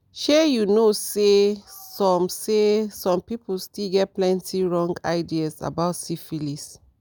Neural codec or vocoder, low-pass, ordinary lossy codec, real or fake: none; none; none; real